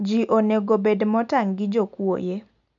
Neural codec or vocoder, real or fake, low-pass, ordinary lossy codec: none; real; 7.2 kHz; none